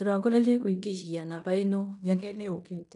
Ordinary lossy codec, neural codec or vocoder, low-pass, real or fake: none; codec, 16 kHz in and 24 kHz out, 0.9 kbps, LongCat-Audio-Codec, fine tuned four codebook decoder; 10.8 kHz; fake